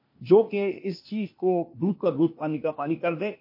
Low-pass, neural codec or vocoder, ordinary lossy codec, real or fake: 5.4 kHz; codec, 16 kHz, 0.8 kbps, ZipCodec; MP3, 32 kbps; fake